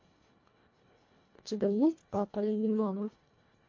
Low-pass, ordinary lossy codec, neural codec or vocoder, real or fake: 7.2 kHz; MP3, 48 kbps; codec, 24 kHz, 1.5 kbps, HILCodec; fake